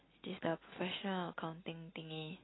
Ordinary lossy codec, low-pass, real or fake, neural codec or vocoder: AAC, 16 kbps; 7.2 kHz; real; none